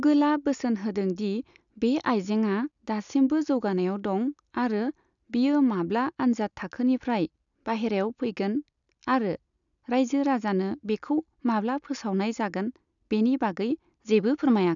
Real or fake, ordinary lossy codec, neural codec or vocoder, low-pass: real; none; none; 7.2 kHz